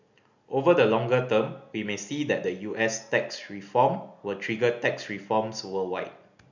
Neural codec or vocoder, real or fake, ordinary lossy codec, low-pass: none; real; none; 7.2 kHz